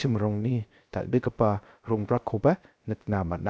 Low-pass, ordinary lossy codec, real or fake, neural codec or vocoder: none; none; fake; codec, 16 kHz, 0.3 kbps, FocalCodec